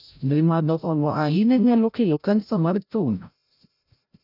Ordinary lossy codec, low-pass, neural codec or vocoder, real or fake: none; 5.4 kHz; codec, 16 kHz, 0.5 kbps, FreqCodec, larger model; fake